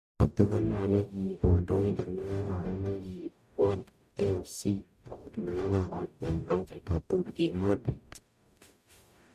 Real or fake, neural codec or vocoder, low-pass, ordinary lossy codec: fake; codec, 44.1 kHz, 0.9 kbps, DAC; 14.4 kHz; MP3, 64 kbps